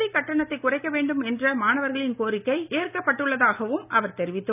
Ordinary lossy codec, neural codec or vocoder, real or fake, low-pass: none; none; real; 3.6 kHz